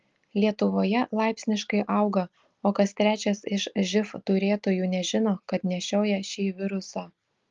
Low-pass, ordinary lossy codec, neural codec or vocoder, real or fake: 7.2 kHz; Opus, 32 kbps; none; real